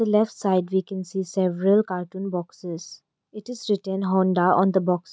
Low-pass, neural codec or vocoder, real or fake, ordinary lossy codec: none; none; real; none